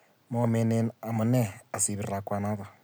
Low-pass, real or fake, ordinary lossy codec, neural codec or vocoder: none; real; none; none